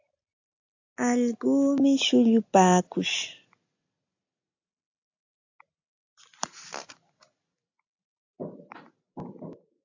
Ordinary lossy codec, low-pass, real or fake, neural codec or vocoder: AAC, 48 kbps; 7.2 kHz; real; none